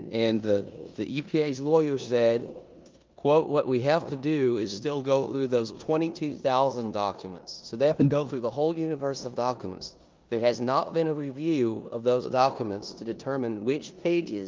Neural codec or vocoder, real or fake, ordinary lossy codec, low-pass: codec, 16 kHz in and 24 kHz out, 0.9 kbps, LongCat-Audio-Codec, four codebook decoder; fake; Opus, 24 kbps; 7.2 kHz